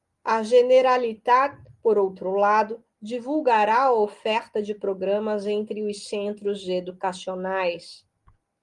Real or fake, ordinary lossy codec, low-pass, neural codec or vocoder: real; Opus, 24 kbps; 10.8 kHz; none